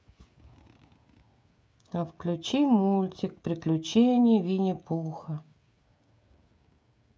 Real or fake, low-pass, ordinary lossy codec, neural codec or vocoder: fake; none; none; codec, 16 kHz, 16 kbps, FreqCodec, smaller model